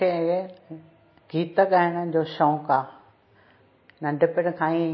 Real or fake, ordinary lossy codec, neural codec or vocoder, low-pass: real; MP3, 24 kbps; none; 7.2 kHz